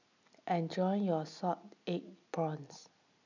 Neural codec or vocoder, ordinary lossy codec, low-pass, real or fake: none; none; 7.2 kHz; real